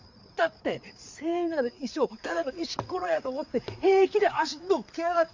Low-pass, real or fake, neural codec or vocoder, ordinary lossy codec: 7.2 kHz; fake; codec, 16 kHz, 4 kbps, FreqCodec, larger model; MP3, 64 kbps